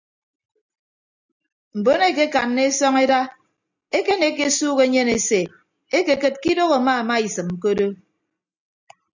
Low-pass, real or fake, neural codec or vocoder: 7.2 kHz; real; none